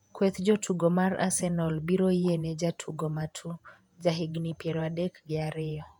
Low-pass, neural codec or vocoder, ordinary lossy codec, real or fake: 19.8 kHz; vocoder, 48 kHz, 128 mel bands, Vocos; MP3, 96 kbps; fake